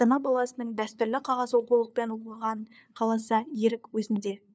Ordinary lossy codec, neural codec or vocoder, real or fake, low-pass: none; codec, 16 kHz, 2 kbps, FunCodec, trained on LibriTTS, 25 frames a second; fake; none